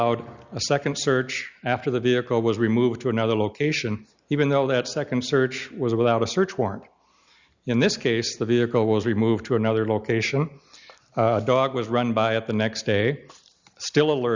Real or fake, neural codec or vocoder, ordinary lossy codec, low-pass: real; none; Opus, 64 kbps; 7.2 kHz